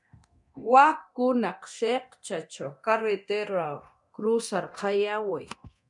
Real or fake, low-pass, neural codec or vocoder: fake; 10.8 kHz; codec, 24 kHz, 0.9 kbps, DualCodec